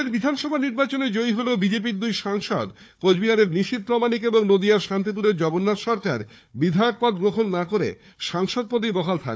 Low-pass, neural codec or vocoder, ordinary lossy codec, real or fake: none; codec, 16 kHz, 4 kbps, FunCodec, trained on Chinese and English, 50 frames a second; none; fake